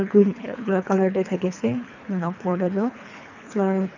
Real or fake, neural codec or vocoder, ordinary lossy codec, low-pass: fake; codec, 24 kHz, 3 kbps, HILCodec; none; 7.2 kHz